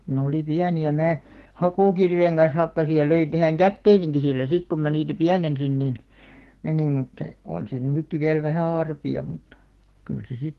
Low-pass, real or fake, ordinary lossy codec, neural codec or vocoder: 14.4 kHz; fake; Opus, 16 kbps; codec, 44.1 kHz, 2.6 kbps, SNAC